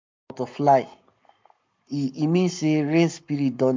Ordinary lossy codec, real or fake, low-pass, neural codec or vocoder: MP3, 64 kbps; fake; 7.2 kHz; codec, 44.1 kHz, 7.8 kbps, Pupu-Codec